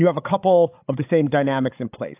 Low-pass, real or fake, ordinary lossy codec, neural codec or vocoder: 3.6 kHz; fake; AAC, 32 kbps; codec, 16 kHz, 8 kbps, FreqCodec, larger model